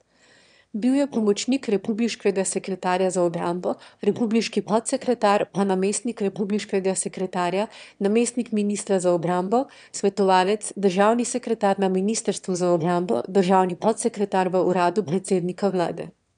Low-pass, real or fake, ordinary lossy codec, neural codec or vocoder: 9.9 kHz; fake; none; autoencoder, 22.05 kHz, a latent of 192 numbers a frame, VITS, trained on one speaker